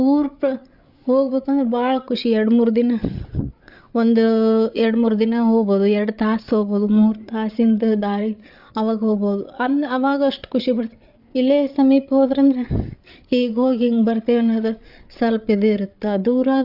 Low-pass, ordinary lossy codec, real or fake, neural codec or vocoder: 5.4 kHz; Opus, 64 kbps; fake; codec, 16 kHz, 8 kbps, FreqCodec, larger model